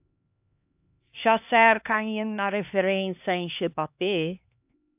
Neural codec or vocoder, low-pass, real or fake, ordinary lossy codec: codec, 16 kHz, 2 kbps, X-Codec, HuBERT features, trained on LibriSpeech; 3.6 kHz; fake; AAC, 32 kbps